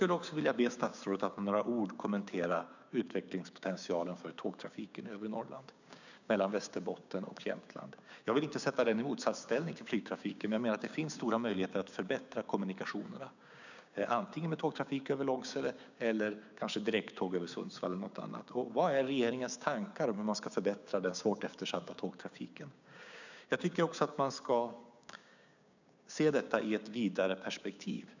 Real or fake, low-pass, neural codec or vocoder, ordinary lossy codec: fake; 7.2 kHz; codec, 16 kHz, 6 kbps, DAC; none